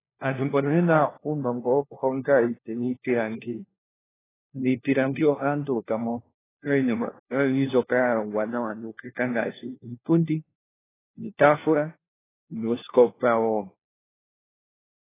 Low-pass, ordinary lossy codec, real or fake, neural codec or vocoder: 3.6 kHz; AAC, 16 kbps; fake; codec, 16 kHz, 1 kbps, FunCodec, trained on LibriTTS, 50 frames a second